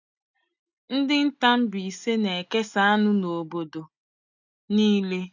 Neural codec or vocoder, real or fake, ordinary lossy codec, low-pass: none; real; none; 7.2 kHz